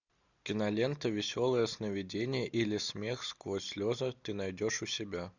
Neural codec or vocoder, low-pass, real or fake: none; 7.2 kHz; real